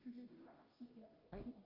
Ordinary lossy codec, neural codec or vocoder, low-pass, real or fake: Opus, 24 kbps; codec, 16 kHz, 0.5 kbps, FunCodec, trained on Chinese and English, 25 frames a second; 5.4 kHz; fake